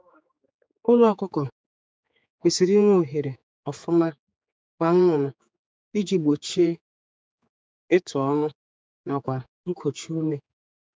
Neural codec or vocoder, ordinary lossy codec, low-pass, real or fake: codec, 16 kHz, 4 kbps, X-Codec, HuBERT features, trained on general audio; none; none; fake